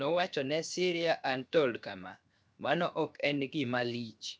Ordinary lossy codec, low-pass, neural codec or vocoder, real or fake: none; none; codec, 16 kHz, 0.7 kbps, FocalCodec; fake